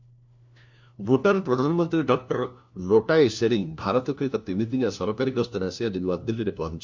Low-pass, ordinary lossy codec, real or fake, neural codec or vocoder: 7.2 kHz; MP3, 64 kbps; fake; codec, 16 kHz, 1 kbps, FunCodec, trained on LibriTTS, 50 frames a second